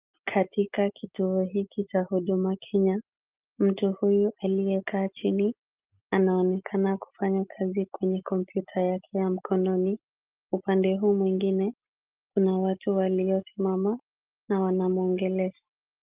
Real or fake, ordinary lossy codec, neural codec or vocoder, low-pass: real; Opus, 32 kbps; none; 3.6 kHz